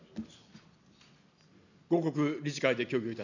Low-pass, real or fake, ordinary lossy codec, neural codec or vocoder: 7.2 kHz; real; none; none